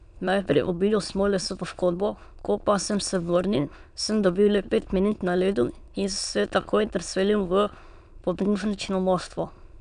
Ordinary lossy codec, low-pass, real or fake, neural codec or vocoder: none; 9.9 kHz; fake; autoencoder, 22.05 kHz, a latent of 192 numbers a frame, VITS, trained on many speakers